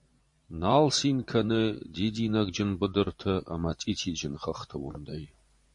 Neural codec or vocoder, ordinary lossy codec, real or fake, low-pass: vocoder, 24 kHz, 100 mel bands, Vocos; MP3, 48 kbps; fake; 10.8 kHz